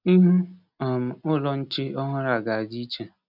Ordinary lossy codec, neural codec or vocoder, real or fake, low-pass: none; none; real; 5.4 kHz